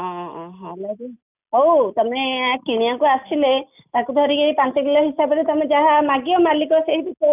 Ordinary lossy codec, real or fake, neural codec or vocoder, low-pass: none; real; none; 3.6 kHz